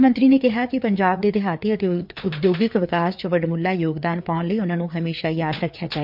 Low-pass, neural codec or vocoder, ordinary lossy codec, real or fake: 5.4 kHz; codec, 16 kHz, 4 kbps, FreqCodec, larger model; MP3, 48 kbps; fake